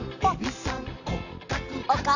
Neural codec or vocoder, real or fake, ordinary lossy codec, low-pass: none; real; none; 7.2 kHz